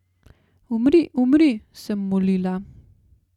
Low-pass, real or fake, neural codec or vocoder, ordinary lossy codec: 19.8 kHz; real; none; none